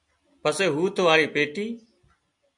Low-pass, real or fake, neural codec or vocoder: 10.8 kHz; real; none